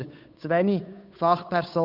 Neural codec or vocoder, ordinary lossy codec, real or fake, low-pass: codec, 16 kHz, 8 kbps, FunCodec, trained on Chinese and English, 25 frames a second; none; fake; 5.4 kHz